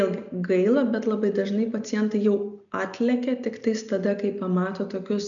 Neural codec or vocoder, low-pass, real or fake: none; 7.2 kHz; real